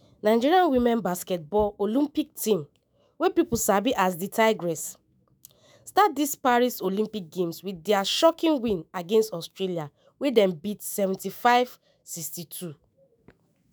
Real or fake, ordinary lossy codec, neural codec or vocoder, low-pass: fake; none; autoencoder, 48 kHz, 128 numbers a frame, DAC-VAE, trained on Japanese speech; none